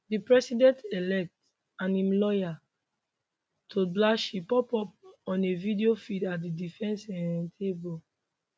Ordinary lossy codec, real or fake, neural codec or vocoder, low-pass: none; real; none; none